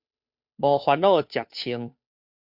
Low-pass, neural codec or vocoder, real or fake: 5.4 kHz; codec, 16 kHz, 2 kbps, FunCodec, trained on Chinese and English, 25 frames a second; fake